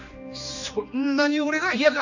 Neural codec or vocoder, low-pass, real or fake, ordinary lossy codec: codec, 16 kHz, 2 kbps, X-Codec, HuBERT features, trained on general audio; 7.2 kHz; fake; none